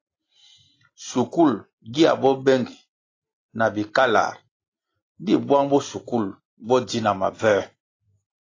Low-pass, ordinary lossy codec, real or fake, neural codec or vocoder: 7.2 kHz; AAC, 32 kbps; real; none